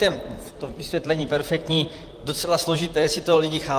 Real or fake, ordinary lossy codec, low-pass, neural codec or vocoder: fake; Opus, 32 kbps; 14.4 kHz; vocoder, 44.1 kHz, 128 mel bands, Pupu-Vocoder